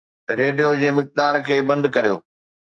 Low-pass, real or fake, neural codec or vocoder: 10.8 kHz; fake; codec, 44.1 kHz, 2.6 kbps, SNAC